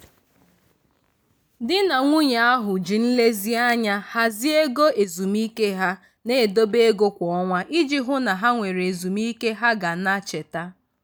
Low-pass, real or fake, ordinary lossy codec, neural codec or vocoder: none; real; none; none